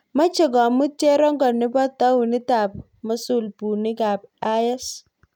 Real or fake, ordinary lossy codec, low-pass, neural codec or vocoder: real; none; 19.8 kHz; none